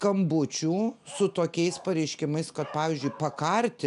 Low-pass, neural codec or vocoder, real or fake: 10.8 kHz; none; real